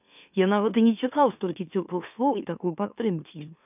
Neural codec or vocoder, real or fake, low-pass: autoencoder, 44.1 kHz, a latent of 192 numbers a frame, MeloTTS; fake; 3.6 kHz